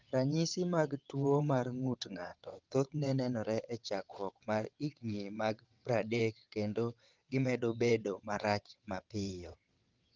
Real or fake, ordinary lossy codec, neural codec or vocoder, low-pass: fake; Opus, 32 kbps; vocoder, 22.05 kHz, 80 mel bands, WaveNeXt; 7.2 kHz